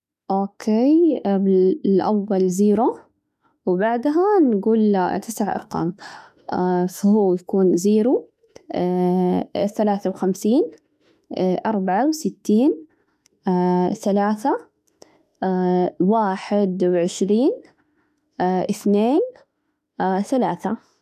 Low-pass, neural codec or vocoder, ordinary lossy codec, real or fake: 14.4 kHz; autoencoder, 48 kHz, 32 numbers a frame, DAC-VAE, trained on Japanese speech; none; fake